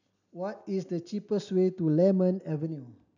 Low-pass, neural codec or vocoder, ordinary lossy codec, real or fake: 7.2 kHz; none; none; real